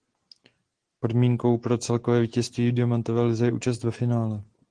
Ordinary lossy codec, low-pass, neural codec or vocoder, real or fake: Opus, 16 kbps; 9.9 kHz; none; real